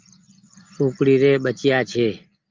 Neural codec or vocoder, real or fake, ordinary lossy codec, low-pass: none; real; Opus, 24 kbps; 7.2 kHz